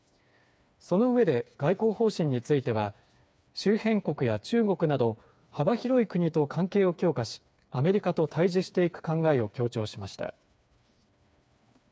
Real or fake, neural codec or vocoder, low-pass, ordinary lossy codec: fake; codec, 16 kHz, 4 kbps, FreqCodec, smaller model; none; none